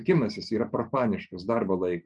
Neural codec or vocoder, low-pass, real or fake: none; 10.8 kHz; real